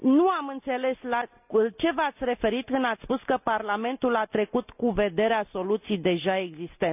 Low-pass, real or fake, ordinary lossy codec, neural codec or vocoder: 3.6 kHz; real; none; none